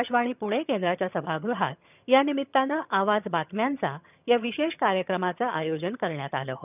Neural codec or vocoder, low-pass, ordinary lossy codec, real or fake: vocoder, 22.05 kHz, 80 mel bands, HiFi-GAN; 3.6 kHz; none; fake